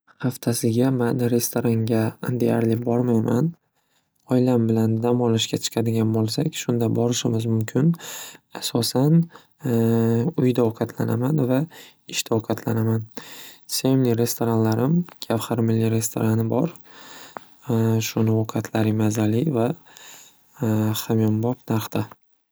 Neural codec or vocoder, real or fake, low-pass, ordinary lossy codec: none; real; none; none